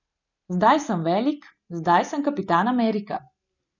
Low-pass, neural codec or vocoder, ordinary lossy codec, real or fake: 7.2 kHz; none; none; real